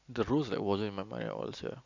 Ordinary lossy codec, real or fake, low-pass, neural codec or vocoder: none; real; 7.2 kHz; none